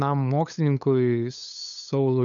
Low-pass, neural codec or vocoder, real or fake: 7.2 kHz; codec, 16 kHz, 8 kbps, FunCodec, trained on LibriTTS, 25 frames a second; fake